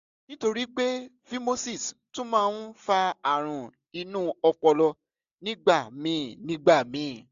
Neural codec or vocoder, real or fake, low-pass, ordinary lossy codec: none; real; 7.2 kHz; AAC, 96 kbps